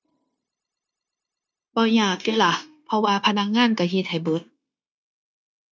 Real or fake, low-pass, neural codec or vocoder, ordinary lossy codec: fake; none; codec, 16 kHz, 0.9 kbps, LongCat-Audio-Codec; none